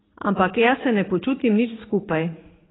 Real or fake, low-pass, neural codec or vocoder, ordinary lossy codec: fake; 7.2 kHz; vocoder, 44.1 kHz, 80 mel bands, Vocos; AAC, 16 kbps